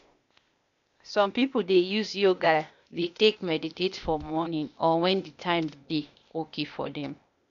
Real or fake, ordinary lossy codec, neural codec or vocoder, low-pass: fake; none; codec, 16 kHz, 0.8 kbps, ZipCodec; 7.2 kHz